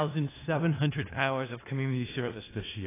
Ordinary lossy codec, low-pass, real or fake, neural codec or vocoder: AAC, 16 kbps; 3.6 kHz; fake; codec, 16 kHz in and 24 kHz out, 0.4 kbps, LongCat-Audio-Codec, four codebook decoder